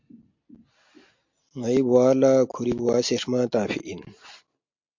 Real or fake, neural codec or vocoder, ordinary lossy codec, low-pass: real; none; MP3, 48 kbps; 7.2 kHz